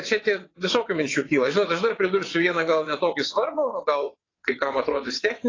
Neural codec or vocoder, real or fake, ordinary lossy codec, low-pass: vocoder, 22.05 kHz, 80 mel bands, WaveNeXt; fake; AAC, 32 kbps; 7.2 kHz